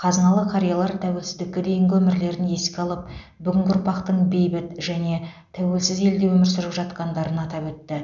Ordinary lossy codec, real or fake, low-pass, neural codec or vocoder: none; real; 7.2 kHz; none